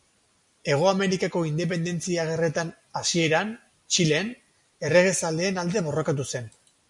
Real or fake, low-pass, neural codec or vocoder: real; 10.8 kHz; none